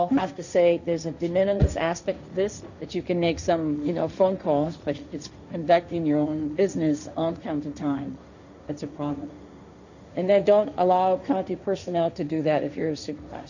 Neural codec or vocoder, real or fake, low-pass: codec, 16 kHz, 1.1 kbps, Voila-Tokenizer; fake; 7.2 kHz